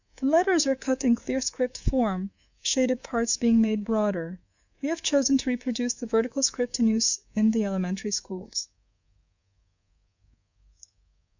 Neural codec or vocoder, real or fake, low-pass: codec, 24 kHz, 3.1 kbps, DualCodec; fake; 7.2 kHz